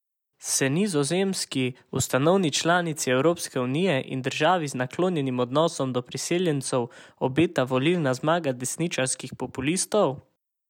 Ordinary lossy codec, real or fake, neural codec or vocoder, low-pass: none; real; none; 19.8 kHz